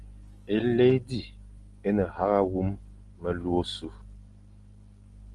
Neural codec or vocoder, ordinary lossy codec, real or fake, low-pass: none; Opus, 24 kbps; real; 10.8 kHz